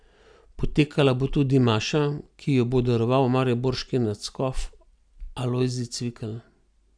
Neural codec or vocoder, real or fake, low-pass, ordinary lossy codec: none; real; 9.9 kHz; none